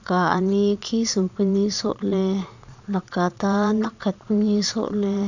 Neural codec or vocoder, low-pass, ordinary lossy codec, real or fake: vocoder, 22.05 kHz, 80 mel bands, Vocos; 7.2 kHz; none; fake